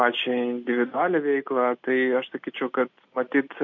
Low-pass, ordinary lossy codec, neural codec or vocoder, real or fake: 7.2 kHz; MP3, 48 kbps; none; real